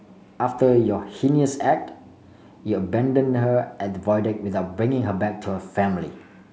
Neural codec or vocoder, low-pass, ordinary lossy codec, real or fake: none; none; none; real